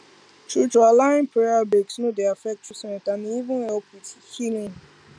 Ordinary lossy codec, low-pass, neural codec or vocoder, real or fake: none; 9.9 kHz; none; real